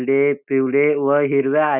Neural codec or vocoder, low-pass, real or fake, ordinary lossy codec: none; 3.6 kHz; real; none